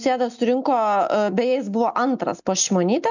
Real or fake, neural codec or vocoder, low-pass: real; none; 7.2 kHz